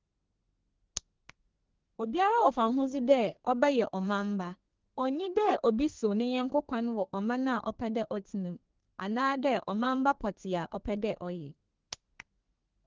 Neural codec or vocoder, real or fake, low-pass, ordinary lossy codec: codec, 32 kHz, 1.9 kbps, SNAC; fake; 7.2 kHz; Opus, 16 kbps